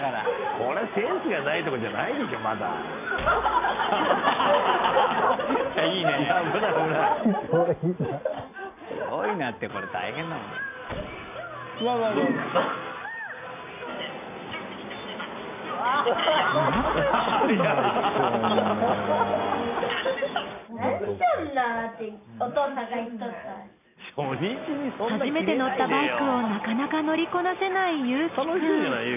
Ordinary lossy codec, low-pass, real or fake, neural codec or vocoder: none; 3.6 kHz; real; none